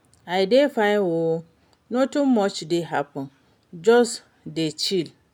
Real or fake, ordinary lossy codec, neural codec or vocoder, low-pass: real; none; none; 19.8 kHz